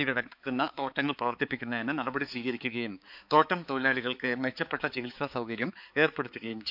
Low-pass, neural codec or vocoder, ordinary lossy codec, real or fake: 5.4 kHz; codec, 16 kHz, 4 kbps, X-Codec, HuBERT features, trained on balanced general audio; none; fake